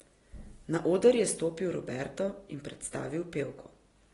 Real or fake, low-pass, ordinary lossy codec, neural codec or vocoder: real; 10.8 kHz; AAC, 32 kbps; none